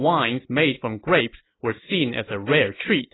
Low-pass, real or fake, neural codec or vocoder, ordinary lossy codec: 7.2 kHz; real; none; AAC, 16 kbps